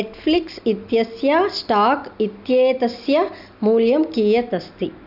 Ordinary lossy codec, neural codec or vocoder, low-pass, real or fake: none; none; 5.4 kHz; real